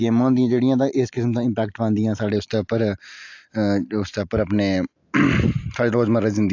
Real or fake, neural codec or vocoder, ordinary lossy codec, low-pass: real; none; AAC, 48 kbps; 7.2 kHz